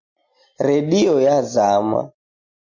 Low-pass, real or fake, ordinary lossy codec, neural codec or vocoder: 7.2 kHz; real; MP3, 48 kbps; none